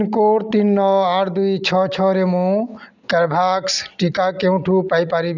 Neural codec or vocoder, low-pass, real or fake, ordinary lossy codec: none; 7.2 kHz; real; none